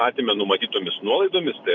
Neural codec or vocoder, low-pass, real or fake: none; 7.2 kHz; real